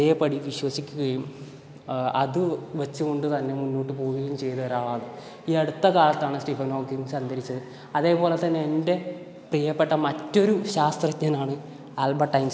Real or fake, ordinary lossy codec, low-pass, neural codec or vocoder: real; none; none; none